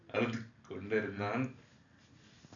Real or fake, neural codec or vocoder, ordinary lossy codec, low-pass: real; none; none; 7.2 kHz